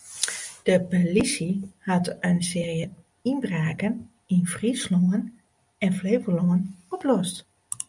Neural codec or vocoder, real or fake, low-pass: none; real; 10.8 kHz